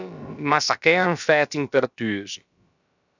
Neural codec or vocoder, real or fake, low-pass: codec, 16 kHz, about 1 kbps, DyCAST, with the encoder's durations; fake; 7.2 kHz